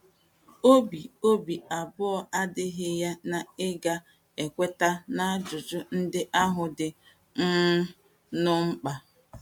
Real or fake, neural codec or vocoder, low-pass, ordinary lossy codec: real; none; 19.8 kHz; MP3, 96 kbps